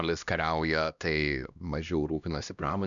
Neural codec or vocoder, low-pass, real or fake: codec, 16 kHz, 1 kbps, X-Codec, HuBERT features, trained on LibriSpeech; 7.2 kHz; fake